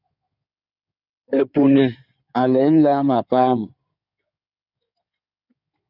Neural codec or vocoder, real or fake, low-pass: codec, 16 kHz in and 24 kHz out, 2.2 kbps, FireRedTTS-2 codec; fake; 5.4 kHz